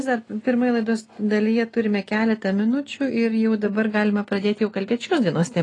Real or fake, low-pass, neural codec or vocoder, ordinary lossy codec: real; 10.8 kHz; none; AAC, 32 kbps